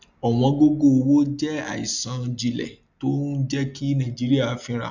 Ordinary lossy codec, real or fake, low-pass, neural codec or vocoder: none; real; 7.2 kHz; none